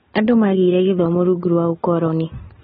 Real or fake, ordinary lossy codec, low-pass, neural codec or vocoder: real; AAC, 16 kbps; 7.2 kHz; none